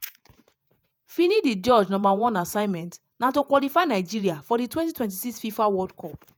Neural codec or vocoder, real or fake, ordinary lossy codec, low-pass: vocoder, 48 kHz, 128 mel bands, Vocos; fake; none; none